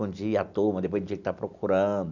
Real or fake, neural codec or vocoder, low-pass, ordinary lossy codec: real; none; 7.2 kHz; none